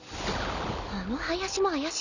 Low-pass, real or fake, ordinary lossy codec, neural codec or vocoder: 7.2 kHz; real; none; none